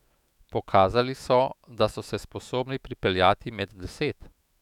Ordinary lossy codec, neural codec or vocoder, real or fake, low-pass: none; autoencoder, 48 kHz, 128 numbers a frame, DAC-VAE, trained on Japanese speech; fake; 19.8 kHz